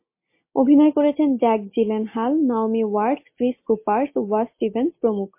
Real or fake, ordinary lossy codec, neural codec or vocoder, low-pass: real; MP3, 24 kbps; none; 3.6 kHz